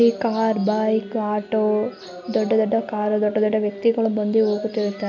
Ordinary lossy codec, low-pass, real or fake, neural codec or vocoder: none; 7.2 kHz; real; none